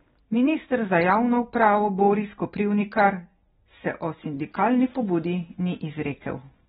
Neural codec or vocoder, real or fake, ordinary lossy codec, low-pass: none; real; AAC, 16 kbps; 7.2 kHz